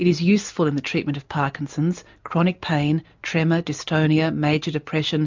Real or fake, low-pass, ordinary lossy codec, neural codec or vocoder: real; 7.2 kHz; MP3, 64 kbps; none